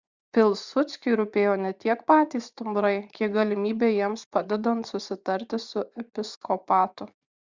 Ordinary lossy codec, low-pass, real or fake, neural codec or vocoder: Opus, 64 kbps; 7.2 kHz; fake; vocoder, 24 kHz, 100 mel bands, Vocos